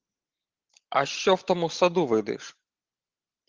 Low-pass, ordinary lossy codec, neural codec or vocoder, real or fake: 7.2 kHz; Opus, 16 kbps; none; real